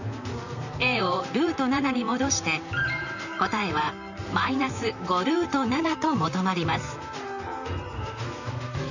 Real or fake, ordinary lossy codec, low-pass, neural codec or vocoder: fake; none; 7.2 kHz; vocoder, 44.1 kHz, 128 mel bands, Pupu-Vocoder